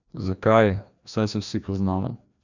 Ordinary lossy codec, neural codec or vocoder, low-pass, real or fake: none; codec, 16 kHz, 1 kbps, FreqCodec, larger model; 7.2 kHz; fake